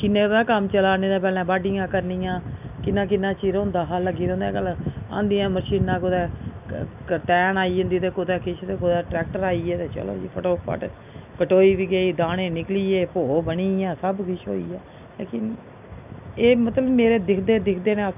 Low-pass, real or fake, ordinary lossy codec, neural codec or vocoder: 3.6 kHz; real; none; none